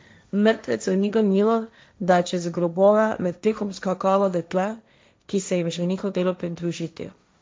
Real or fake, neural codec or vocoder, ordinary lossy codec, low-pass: fake; codec, 16 kHz, 1.1 kbps, Voila-Tokenizer; none; none